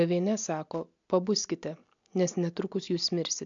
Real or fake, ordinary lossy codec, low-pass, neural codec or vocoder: real; MP3, 64 kbps; 7.2 kHz; none